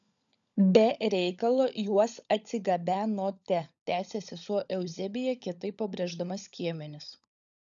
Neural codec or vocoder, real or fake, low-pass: codec, 16 kHz, 16 kbps, FunCodec, trained on LibriTTS, 50 frames a second; fake; 7.2 kHz